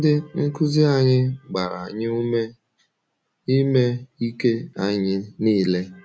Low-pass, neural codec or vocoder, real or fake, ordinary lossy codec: none; none; real; none